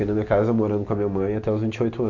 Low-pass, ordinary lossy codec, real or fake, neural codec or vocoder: 7.2 kHz; AAC, 48 kbps; real; none